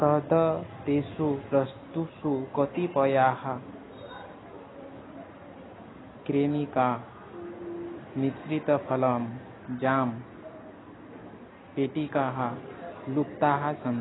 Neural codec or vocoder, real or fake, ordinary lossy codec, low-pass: none; real; AAC, 16 kbps; 7.2 kHz